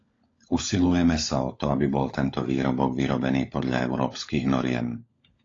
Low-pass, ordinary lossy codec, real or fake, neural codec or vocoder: 7.2 kHz; AAC, 32 kbps; fake; codec, 16 kHz, 16 kbps, FunCodec, trained on LibriTTS, 50 frames a second